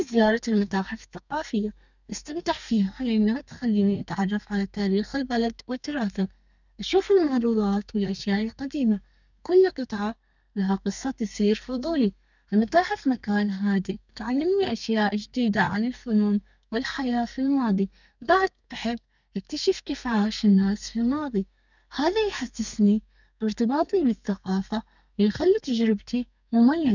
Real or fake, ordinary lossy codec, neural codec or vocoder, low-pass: fake; none; codec, 32 kHz, 1.9 kbps, SNAC; 7.2 kHz